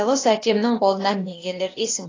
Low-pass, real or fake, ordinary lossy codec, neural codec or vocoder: 7.2 kHz; fake; AAC, 32 kbps; codec, 16 kHz, 0.8 kbps, ZipCodec